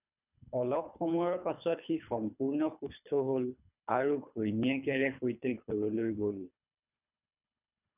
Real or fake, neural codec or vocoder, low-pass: fake; codec, 24 kHz, 3 kbps, HILCodec; 3.6 kHz